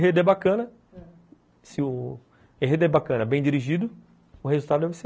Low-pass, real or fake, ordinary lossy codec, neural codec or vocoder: none; real; none; none